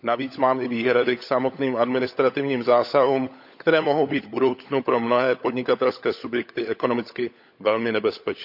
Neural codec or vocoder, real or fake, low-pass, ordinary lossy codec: codec, 16 kHz, 16 kbps, FunCodec, trained on LibriTTS, 50 frames a second; fake; 5.4 kHz; none